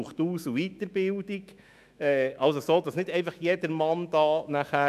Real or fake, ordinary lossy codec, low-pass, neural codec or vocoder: fake; none; 14.4 kHz; autoencoder, 48 kHz, 128 numbers a frame, DAC-VAE, trained on Japanese speech